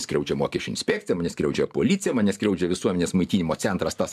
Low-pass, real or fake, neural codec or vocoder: 14.4 kHz; fake; vocoder, 44.1 kHz, 128 mel bands every 512 samples, BigVGAN v2